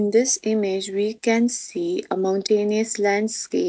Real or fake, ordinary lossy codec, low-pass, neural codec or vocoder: real; none; none; none